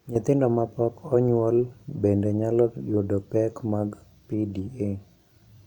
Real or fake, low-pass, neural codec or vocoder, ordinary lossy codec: fake; 19.8 kHz; vocoder, 44.1 kHz, 128 mel bands every 256 samples, BigVGAN v2; none